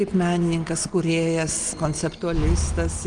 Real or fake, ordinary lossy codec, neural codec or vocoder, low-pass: real; Opus, 32 kbps; none; 9.9 kHz